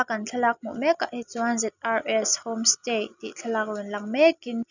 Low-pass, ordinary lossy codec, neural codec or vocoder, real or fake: 7.2 kHz; none; none; real